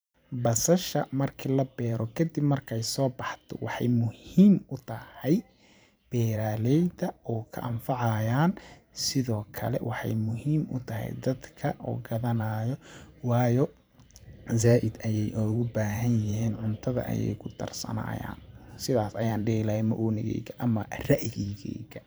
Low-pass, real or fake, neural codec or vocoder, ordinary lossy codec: none; fake; vocoder, 44.1 kHz, 128 mel bands every 512 samples, BigVGAN v2; none